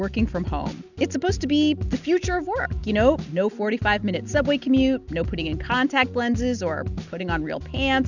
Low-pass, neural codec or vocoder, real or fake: 7.2 kHz; none; real